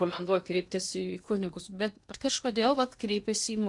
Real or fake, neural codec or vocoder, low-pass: fake; codec, 16 kHz in and 24 kHz out, 0.8 kbps, FocalCodec, streaming, 65536 codes; 10.8 kHz